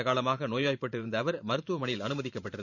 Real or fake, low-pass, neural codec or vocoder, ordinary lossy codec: fake; 7.2 kHz; vocoder, 44.1 kHz, 128 mel bands every 512 samples, BigVGAN v2; none